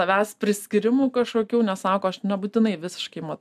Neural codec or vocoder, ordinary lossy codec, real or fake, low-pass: none; MP3, 96 kbps; real; 14.4 kHz